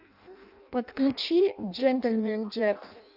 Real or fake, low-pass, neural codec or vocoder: fake; 5.4 kHz; codec, 16 kHz in and 24 kHz out, 0.6 kbps, FireRedTTS-2 codec